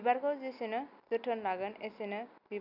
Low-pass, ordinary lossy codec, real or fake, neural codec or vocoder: 5.4 kHz; none; real; none